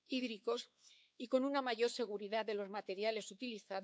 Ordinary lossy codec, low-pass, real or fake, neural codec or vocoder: none; none; fake; codec, 16 kHz, 4 kbps, X-Codec, WavLM features, trained on Multilingual LibriSpeech